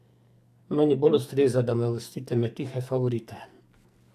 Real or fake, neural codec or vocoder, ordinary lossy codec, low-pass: fake; codec, 44.1 kHz, 2.6 kbps, SNAC; AAC, 96 kbps; 14.4 kHz